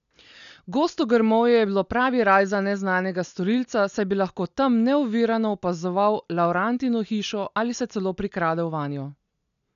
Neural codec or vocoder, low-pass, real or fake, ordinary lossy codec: none; 7.2 kHz; real; none